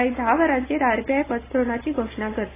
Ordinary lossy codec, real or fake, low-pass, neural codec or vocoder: AAC, 16 kbps; real; 3.6 kHz; none